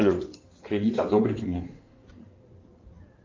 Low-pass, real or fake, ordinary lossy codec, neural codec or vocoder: 7.2 kHz; fake; Opus, 24 kbps; codec, 16 kHz in and 24 kHz out, 2.2 kbps, FireRedTTS-2 codec